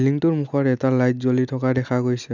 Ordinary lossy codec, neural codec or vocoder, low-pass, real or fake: none; none; 7.2 kHz; real